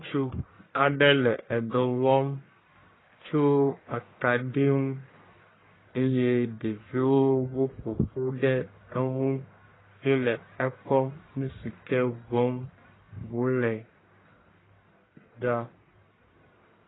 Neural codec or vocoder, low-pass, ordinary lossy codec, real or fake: codec, 44.1 kHz, 1.7 kbps, Pupu-Codec; 7.2 kHz; AAC, 16 kbps; fake